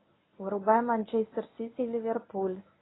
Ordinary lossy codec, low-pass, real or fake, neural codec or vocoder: AAC, 16 kbps; 7.2 kHz; fake; codec, 24 kHz, 0.9 kbps, WavTokenizer, medium speech release version 1